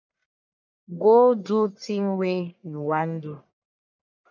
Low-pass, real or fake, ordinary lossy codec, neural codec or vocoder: 7.2 kHz; fake; MP3, 64 kbps; codec, 44.1 kHz, 1.7 kbps, Pupu-Codec